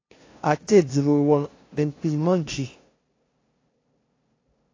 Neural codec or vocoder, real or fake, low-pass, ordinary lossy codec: codec, 16 kHz, 0.5 kbps, FunCodec, trained on LibriTTS, 25 frames a second; fake; 7.2 kHz; AAC, 32 kbps